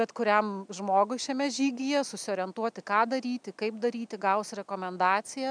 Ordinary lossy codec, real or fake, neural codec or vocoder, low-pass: MP3, 96 kbps; real; none; 9.9 kHz